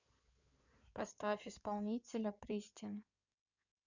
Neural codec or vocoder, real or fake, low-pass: codec, 16 kHz in and 24 kHz out, 2.2 kbps, FireRedTTS-2 codec; fake; 7.2 kHz